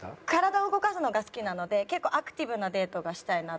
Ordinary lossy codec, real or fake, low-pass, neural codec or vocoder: none; real; none; none